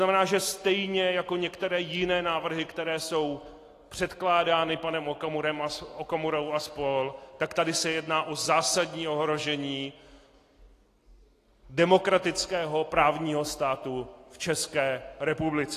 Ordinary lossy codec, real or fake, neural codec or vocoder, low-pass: AAC, 48 kbps; real; none; 14.4 kHz